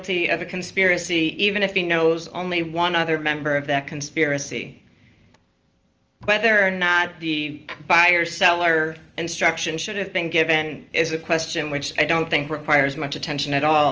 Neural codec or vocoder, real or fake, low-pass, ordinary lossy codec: none; real; 7.2 kHz; Opus, 24 kbps